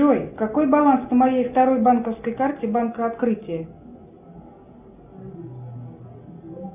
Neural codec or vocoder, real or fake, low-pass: none; real; 3.6 kHz